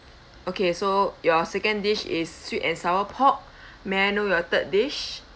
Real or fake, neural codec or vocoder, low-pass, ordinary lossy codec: real; none; none; none